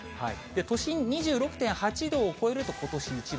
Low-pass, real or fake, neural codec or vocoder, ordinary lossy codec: none; real; none; none